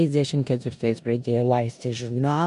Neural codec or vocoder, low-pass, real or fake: codec, 16 kHz in and 24 kHz out, 0.9 kbps, LongCat-Audio-Codec, four codebook decoder; 10.8 kHz; fake